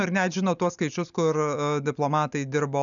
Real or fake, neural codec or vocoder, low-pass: real; none; 7.2 kHz